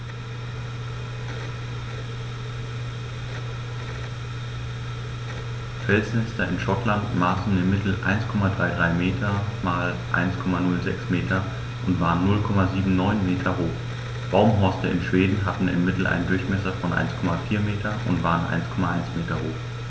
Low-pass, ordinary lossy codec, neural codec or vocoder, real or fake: none; none; none; real